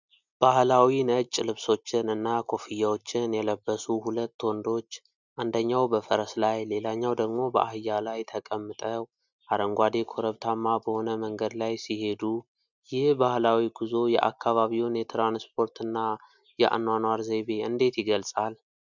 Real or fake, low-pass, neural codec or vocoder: real; 7.2 kHz; none